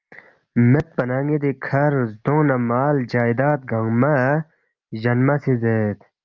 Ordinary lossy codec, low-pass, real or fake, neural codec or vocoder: Opus, 24 kbps; 7.2 kHz; real; none